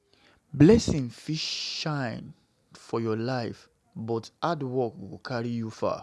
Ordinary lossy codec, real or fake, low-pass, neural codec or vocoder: none; real; none; none